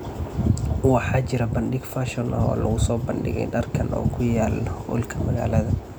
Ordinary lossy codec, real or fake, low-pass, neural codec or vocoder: none; real; none; none